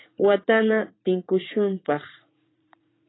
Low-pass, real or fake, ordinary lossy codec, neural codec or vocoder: 7.2 kHz; fake; AAC, 16 kbps; vocoder, 44.1 kHz, 80 mel bands, Vocos